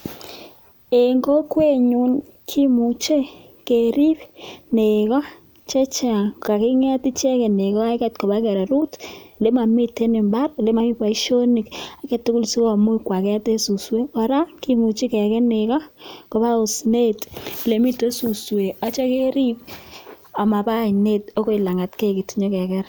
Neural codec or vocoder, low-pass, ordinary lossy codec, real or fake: none; none; none; real